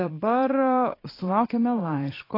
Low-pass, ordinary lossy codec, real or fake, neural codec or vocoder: 5.4 kHz; AAC, 24 kbps; fake; vocoder, 22.05 kHz, 80 mel bands, Vocos